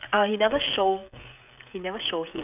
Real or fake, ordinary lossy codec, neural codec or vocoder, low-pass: fake; none; codec, 16 kHz, 16 kbps, FreqCodec, smaller model; 3.6 kHz